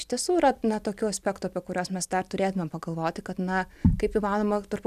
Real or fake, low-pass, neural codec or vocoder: real; 14.4 kHz; none